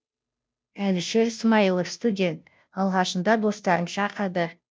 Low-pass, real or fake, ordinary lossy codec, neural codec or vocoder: none; fake; none; codec, 16 kHz, 0.5 kbps, FunCodec, trained on Chinese and English, 25 frames a second